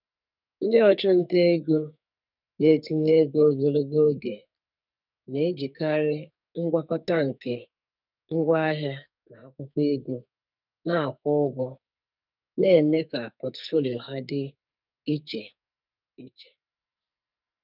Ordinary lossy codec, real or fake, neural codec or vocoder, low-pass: none; fake; codec, 44.1 kHz, 2.6 kbps, SNAC; 5.4 kHz